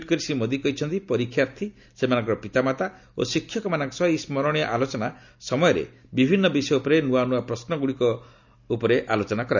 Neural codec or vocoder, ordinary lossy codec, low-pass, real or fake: none; none; 7.2 kHz; real